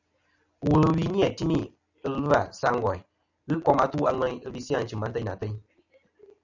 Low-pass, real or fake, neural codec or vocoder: 7.2 kHz; real; none